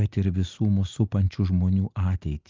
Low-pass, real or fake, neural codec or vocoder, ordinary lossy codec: 7.2 kHz; real; none; Opus, 32 kbps